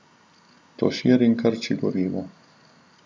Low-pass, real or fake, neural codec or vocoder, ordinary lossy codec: none; real; none; none